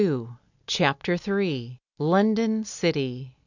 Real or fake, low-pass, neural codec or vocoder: real; 7.2 kHz; none